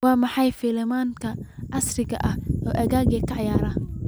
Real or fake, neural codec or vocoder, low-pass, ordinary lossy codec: real; none; none; none